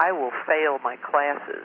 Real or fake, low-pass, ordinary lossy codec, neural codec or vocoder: real; 5.4 kHz; AAC, 24 kbps; none